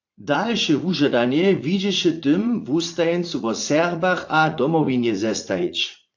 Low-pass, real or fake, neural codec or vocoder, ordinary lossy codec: 7.2 kHz; fake; vocoder, 44.1 kHz, 80 mel bands, Vocos; AAC, 48 kbps